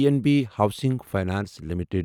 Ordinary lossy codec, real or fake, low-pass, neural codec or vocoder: none; real; 19.8 kHz; none